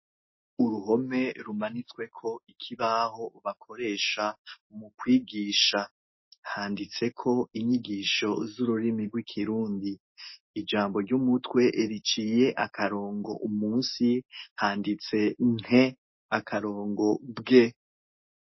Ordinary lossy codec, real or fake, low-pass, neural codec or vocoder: MP3, 24 kbps; real; 7.2 kHz; none